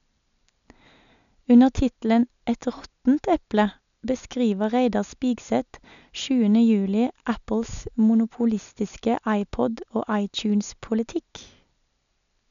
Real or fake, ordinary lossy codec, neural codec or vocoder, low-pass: real; none; none; 7.2 kHz